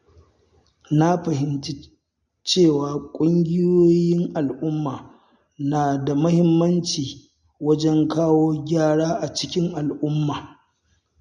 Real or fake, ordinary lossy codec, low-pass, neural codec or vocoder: real; MP3, 64 kbps; 10.8 kHz; none